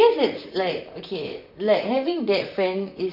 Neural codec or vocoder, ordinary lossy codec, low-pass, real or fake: vocoder, 44.1 kHz, 128 mel bands, Pupu-Vocoder; none; 5.4 kHz; fake